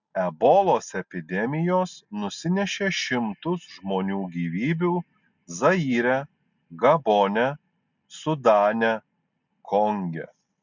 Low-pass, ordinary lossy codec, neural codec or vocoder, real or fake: 7.2 kHz; MP3, 64 kbps; none; real